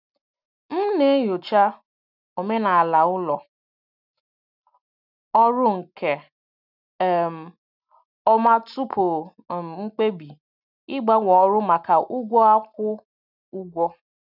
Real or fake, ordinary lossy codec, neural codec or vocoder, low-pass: real; none; none; 5.4 kHz